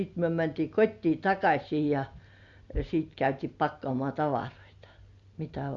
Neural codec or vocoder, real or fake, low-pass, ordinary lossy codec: none; real; 7.2 kHz; Opus, 64 kbps